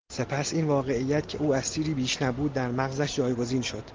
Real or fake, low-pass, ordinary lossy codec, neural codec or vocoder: real; 7.2 kHz; Opus, 16 kbps; none